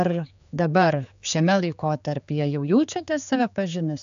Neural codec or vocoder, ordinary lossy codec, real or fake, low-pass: codec, 16 kHz, 4 kbps, X-Codec, HuBERT features, trained on general audio; AAC, 96 kbps; fake; 7.2 kHz